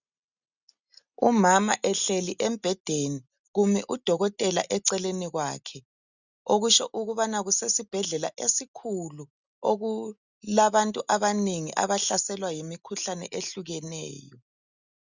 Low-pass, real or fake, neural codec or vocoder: 7.2 kHz; real; none